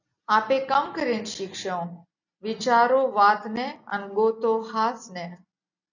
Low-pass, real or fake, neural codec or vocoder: 7.2 kHz; real; none